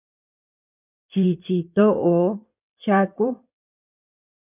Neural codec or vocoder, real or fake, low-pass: codec, 16 kHz in and 24 kHz out, 2.2 kbps, FireRedTTS-2 codec; fake; 3.6 kHz